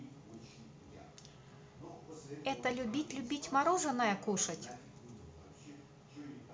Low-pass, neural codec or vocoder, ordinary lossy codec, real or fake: none; none; none; real